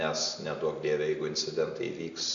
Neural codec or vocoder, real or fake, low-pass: none; real; 7.2 kHz